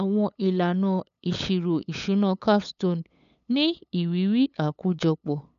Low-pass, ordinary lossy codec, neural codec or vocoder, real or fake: 7.2 kHz; none; codec, 16 kHz, 8 kbps, FunCodec, trained on LibriTTS, 25 frames a second; fake